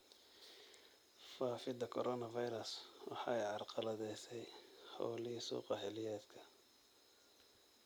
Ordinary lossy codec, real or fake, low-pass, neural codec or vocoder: none; real; none; none